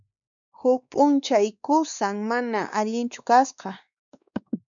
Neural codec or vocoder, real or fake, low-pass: codec, 16 kHz, 2 kbps, X-Codec, WavLM features, trained on Multilingual LibriSpeech; fake; 7.2 kHz